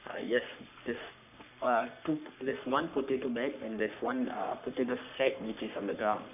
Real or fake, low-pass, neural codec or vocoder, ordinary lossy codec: fake; 3.6 kHz; codec, 44.1 kHz, 3.4 kbps, Pupu-Codec; none